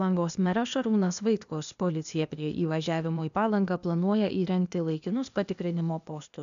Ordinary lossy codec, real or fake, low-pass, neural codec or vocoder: AAC, 96 kbps; fake; 7.2 kHz; codec, 16 kHz, 0.8 kbps, ZipCodec